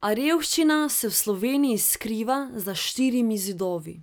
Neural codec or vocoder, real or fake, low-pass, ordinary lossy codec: none; real; none; none